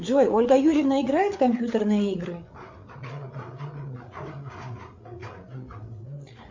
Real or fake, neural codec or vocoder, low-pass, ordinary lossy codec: fake; codec, 16 kHz, 8 kbps, FreqCodec, larger model; 7.2 kHz; MP3, 64 kbps